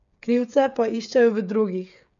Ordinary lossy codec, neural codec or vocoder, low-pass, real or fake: none; codec, 16 kHz, 8 kbps, FreqCodec, smaller model; 7.2 kHz; fake